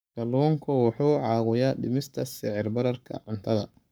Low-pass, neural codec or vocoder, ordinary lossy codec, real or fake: none; codec, 44.1 kHz, 7.8 kbps, Pupu-Codec; none; fake